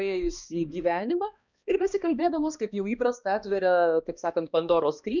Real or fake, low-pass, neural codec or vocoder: fake; 7.2 kHz; codec, 16 kHz, 2 kbps, X-Codec, HuBERT features, trained on balanced general audio